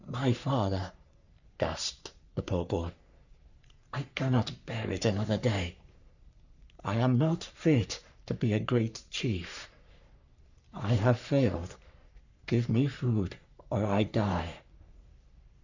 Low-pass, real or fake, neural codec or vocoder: 7.2 kHz; fake; codec, 44.1 kHz, 3.4 kbps, Pupu-Codec